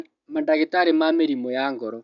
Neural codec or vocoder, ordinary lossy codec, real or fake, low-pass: none; none; real; 7.2 kHz